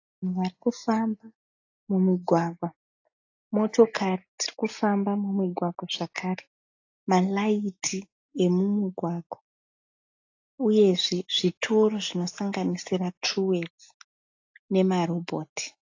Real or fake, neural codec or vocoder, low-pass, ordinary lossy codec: real; none; 7.2 kHz; AAC, 48 kbps